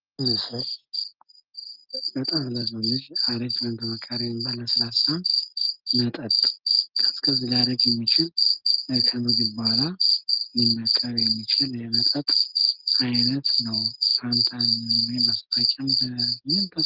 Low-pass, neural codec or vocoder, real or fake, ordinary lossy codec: 5.4 kHz; none; real; Opus, 24 kbps